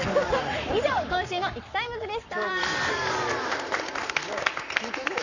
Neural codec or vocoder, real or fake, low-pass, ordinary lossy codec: codec, 44.1 kHz, 7.8 kbps, Pupu-Codec; fake; 7.2 kHz; none